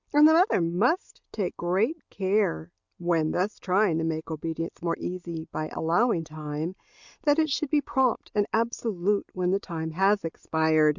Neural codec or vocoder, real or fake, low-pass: none; real; 7.2 kHz